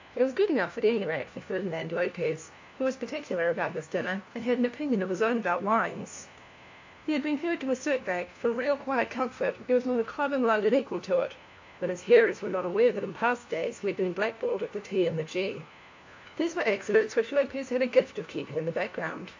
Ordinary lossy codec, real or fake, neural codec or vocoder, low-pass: AAC, 48 kbps; fake; codec, 16 kHz, 1 kbps, FunCodec, trained on LibriTTS, 50 frames a second; 7.2 kHz